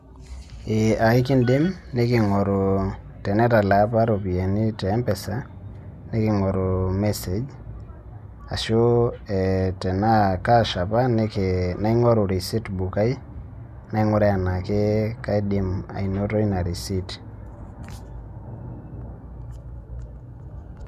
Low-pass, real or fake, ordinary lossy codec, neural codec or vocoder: 14.4 kHz; real; none; none